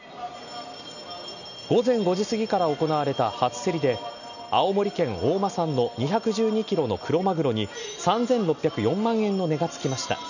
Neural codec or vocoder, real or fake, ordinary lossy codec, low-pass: none; real; none; 7.2 kHz